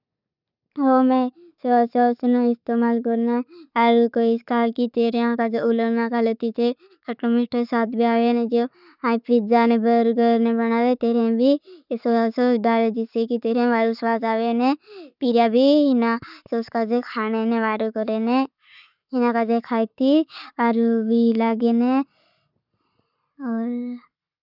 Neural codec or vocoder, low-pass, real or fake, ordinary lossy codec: none; 5.4 kHz; real; none